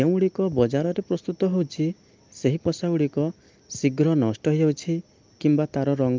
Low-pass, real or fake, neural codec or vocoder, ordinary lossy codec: 7.2 kHz; real; none; Opus, 32 kbps